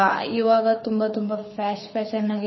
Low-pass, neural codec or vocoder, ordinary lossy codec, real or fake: 7.2 kHz; codec, 24 kHz, 6 kbps, HILCodec; MP3, 24 kbps; fake